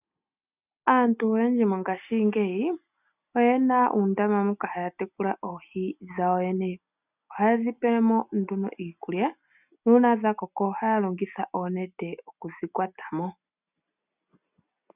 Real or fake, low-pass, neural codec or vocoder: real; 3.6 kHz; none